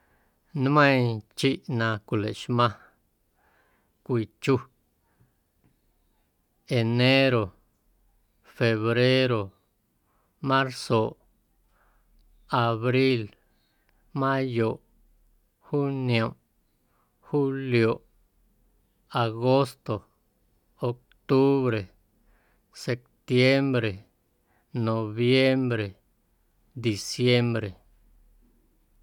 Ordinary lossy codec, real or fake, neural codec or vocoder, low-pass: none; real; none; 19.8 kHz